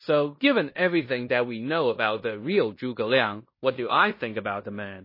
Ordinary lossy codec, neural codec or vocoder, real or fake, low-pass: MP3, 24 kbps; codec, 16 kHz in and 24 kHz out, 0.9 kbps, LongCat-Audio-Codec, fine tuned four codebook decoder; fake; 5.4 kHz